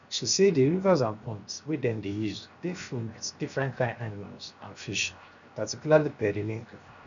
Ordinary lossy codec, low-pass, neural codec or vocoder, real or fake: none; 7.2 kHz; codec, 16 kHz, 0.7 kbps, FocalCodec; fake